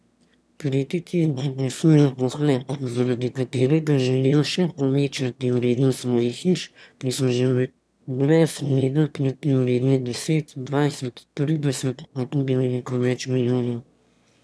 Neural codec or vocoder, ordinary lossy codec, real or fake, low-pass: autoencoder, 22.05 kHz, a latent of 192 numbers a frame, VITS, trained on one speaker; none; fake; none